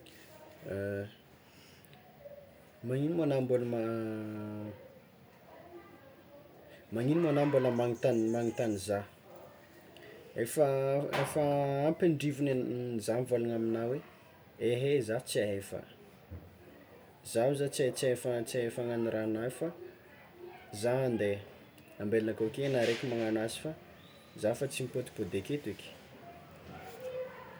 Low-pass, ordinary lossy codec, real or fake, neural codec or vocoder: none; none; real; none